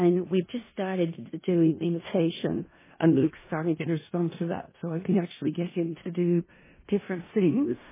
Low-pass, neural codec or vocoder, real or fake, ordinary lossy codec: 3.6 kHz; codec, 16 kHz in and 24 kHz out, 0.4 kbps, LongCat-Audio-Codec, four codebook decoder; fake; MP3, 16 kbps